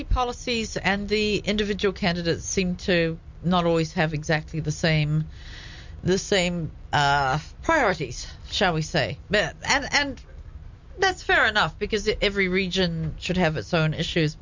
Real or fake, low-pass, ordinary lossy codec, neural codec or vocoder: real; 7.2 kHz; MP3, 64 kbps; none